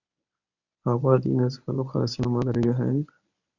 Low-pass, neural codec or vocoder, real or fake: 7.2 kHz; codec, 24 kHz, 0.9 kbps, WavTokenizer, medium speech release version 1; fake